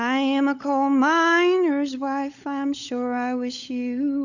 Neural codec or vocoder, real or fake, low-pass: codec, 16 kHz, 16 kbps, FunCodec, trained on LibriTTS, 50 frames a second; fake; 7.2 kHz